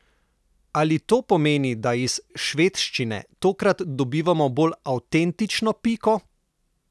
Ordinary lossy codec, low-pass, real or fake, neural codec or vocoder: none; none; real; none